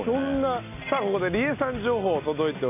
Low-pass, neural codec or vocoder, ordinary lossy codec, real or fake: 3.6 kHz; none; none; real